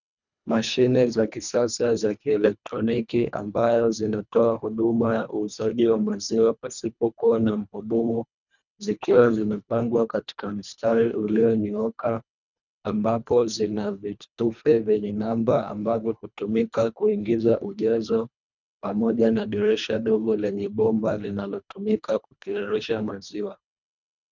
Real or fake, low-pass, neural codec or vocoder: fake; 7.2 kHz; codec, 24 kHz, 1.5 kbps, HILCodec